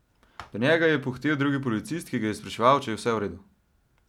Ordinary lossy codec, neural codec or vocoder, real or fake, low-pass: none; none; real; 19.8 kHz